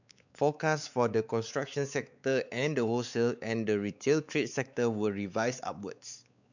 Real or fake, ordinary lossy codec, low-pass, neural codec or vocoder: fake; none; 7.2 kHz; codec, 16 kHz, 4 kbps, X-Codec, WavLM features, trained on Multilingual LibriSpeech